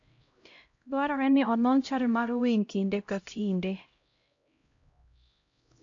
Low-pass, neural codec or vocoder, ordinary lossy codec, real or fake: 7.2 kHz; codec, 16 kHz, 0.5 kbps, X-Codec, HuBERT features, trained on LibriSpeech; AAC, 48 kbps; fake